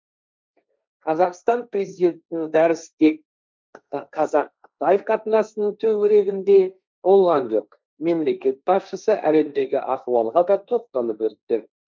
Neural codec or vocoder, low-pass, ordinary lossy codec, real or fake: codec, 16 kHz, 1.1 kbps, Voila-Tokenizer; none; none; fake